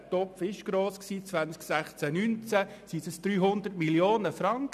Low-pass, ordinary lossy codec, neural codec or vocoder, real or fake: 14.4 kHz; none; none; real